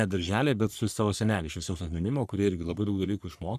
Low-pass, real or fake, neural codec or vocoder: 14.4 kHz; fake; codec, 44.1 kHz, 3.4 kbps, Pupu-Codec